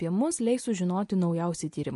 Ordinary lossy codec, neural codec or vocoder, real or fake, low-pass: MP3, 48 kbps; none; real; 14.4 kHz